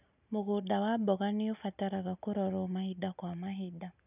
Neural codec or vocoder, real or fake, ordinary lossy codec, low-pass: none; real; none; 3.6 kHz